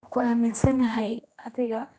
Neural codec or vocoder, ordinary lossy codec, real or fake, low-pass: codec, 16 kHz, 1 kbps, X-Codec, HuBERT features, trained on general audio; none; fake; none